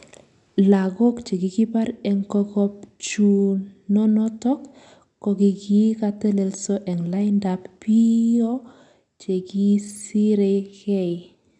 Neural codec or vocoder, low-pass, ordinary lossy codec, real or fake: none; 10.8 kHz; none; real